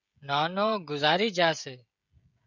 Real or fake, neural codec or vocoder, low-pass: fake; codec, 16 kHz, 16 kbps, FreqCodec, smaller model; 7.2 kHz